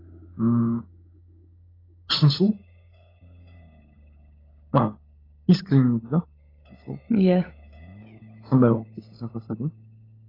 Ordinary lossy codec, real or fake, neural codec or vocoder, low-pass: AAC, 24 kbps; fake; codec, 16 kHz, 16 kbps, FunCodec, trained on LibriTTS, 50 frames a second; 5.4 kHz